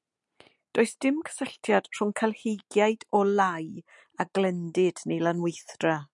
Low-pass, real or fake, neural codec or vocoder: 10.8 kHz; real; none